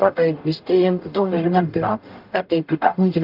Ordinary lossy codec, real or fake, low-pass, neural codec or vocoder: Opus, 32 kbps; fake; 5.4 kHz; codec, 44.1 kHz, 0.9 kbps, DAC